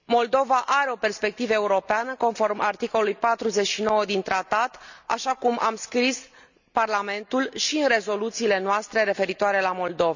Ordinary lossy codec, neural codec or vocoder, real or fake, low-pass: none; none; real; 7.2 kHz